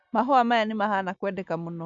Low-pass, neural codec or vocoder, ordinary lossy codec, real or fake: 7.2 kHz; none; none; real